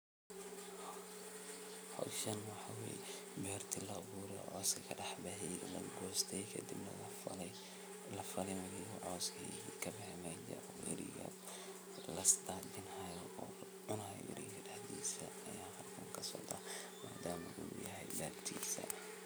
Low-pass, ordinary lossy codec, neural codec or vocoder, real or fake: none; none; none; real